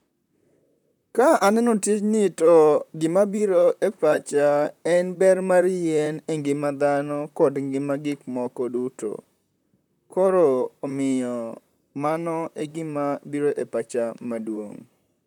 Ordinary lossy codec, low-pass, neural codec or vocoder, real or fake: none; 19.8 kHz; vocoder, 44.1 kHz, 128 mel bands, Pupu-Vocoder; fake